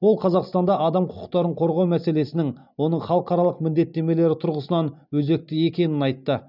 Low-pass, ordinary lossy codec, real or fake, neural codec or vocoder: 5.4 kHz; none; real; none